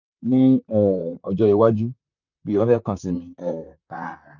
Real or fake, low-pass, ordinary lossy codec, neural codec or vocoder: fake; 7.2 kHz; none; vocoder, 44.1 kHz, 128 mel bands, Pupu-Vocoder